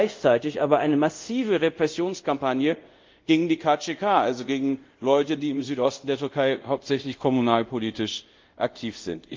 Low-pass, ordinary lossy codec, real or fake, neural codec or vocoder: 7.2 kHz; Opus, 32 kbps; fake; codec, 24 kHz, 0.5 kbps, DualCodec